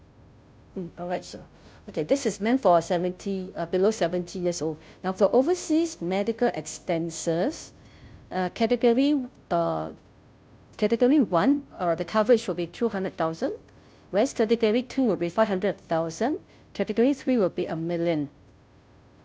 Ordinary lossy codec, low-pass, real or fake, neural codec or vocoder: none; none; fake; codec, 16 kHz, 0.5 kbps, FunCodec, trained on Chinese and English, 25 frames a second